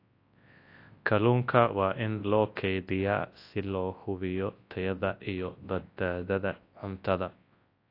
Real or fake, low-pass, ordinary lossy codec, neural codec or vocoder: fake; 5.4 kHz; AAC, 32 kbps; codec, 24 kHz, 0.9 kbps, WavTokenizer, large speech release